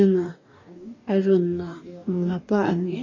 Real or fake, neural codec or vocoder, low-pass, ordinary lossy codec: fake; codec, 44.1 kHz, 2.6 kbps, DAC; 7.2 kHz; MP3, 32 kbps